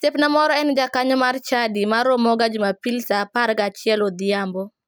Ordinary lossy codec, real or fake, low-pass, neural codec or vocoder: none; real; none; none